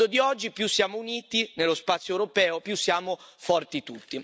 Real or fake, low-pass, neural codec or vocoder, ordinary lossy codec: real; none; none; none